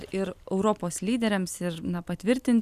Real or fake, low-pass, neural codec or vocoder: real; 14.4 kHz; none